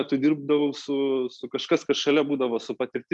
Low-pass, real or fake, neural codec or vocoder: 10.8 kHz; real; none